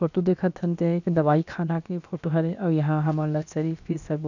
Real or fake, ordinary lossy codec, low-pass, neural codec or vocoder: fake; none; 7.2 kHz; codec, 16 kHz, about 1 kbps, DyCAST, with the encoder's durations